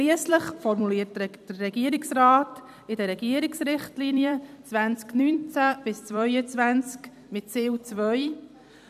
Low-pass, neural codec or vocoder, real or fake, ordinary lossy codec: 14.4 kHz; vocoder, 44.1 kHz, 128 mel bands every 256 samples, BigVGAN v2; fake; none